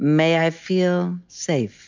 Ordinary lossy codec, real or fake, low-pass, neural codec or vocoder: MP3, 64 kbps; real; 7.2 kHz; none